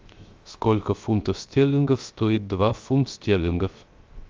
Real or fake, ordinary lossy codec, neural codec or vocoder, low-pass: fake; Opus, 32 kbps; codec, 16 kHz, 0.3 kbps, FocalCodec; 7.2 kHz